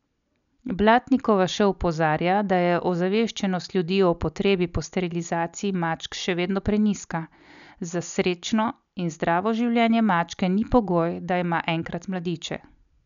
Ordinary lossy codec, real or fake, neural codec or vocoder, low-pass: none; real; none; 7.2 kHz